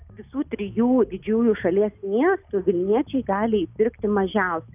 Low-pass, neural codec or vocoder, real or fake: 3.6 kHz; none; real